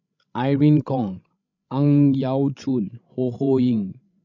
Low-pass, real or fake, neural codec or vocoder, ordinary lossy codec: 7.2 kHz; fake; codec, 16 kHz, 16 kbps, FreqCodec, larger model; none